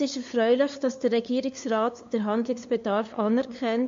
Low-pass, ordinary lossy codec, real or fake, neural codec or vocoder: 7.2 kHz; MP3, 48 kbps; fake; codec, 16 kHz, 2 kbps, FunCodec, trained on LibriTTS, 25 frames a second